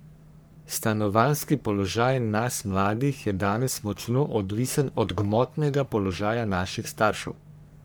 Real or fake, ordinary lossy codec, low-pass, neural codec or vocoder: fake; none; none; codec, 44.1 kHz, 3.4 kbps, Pupu-Codec